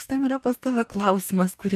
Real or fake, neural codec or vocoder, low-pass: fake; codec, 44.1 kHz, 2.6 kbps, DAC; 14.4 kHz